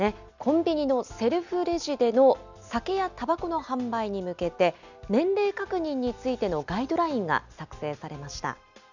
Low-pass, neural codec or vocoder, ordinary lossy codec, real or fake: 7.2 kHz; none; none; real